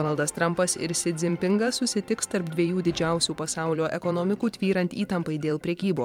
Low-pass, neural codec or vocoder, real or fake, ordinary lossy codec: 19.8 kHz; vocoder, 44.1 kHz, 128 mel bands every 512 samples, BigVGAN v2; fake; MP3, 96 kbps